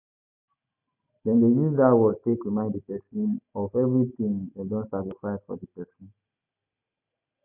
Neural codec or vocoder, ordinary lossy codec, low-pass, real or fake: none; none; 3.6 kHz; real